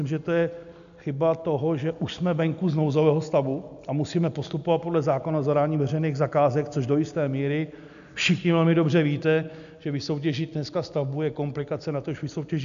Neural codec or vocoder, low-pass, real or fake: none; 7.2 kHz; real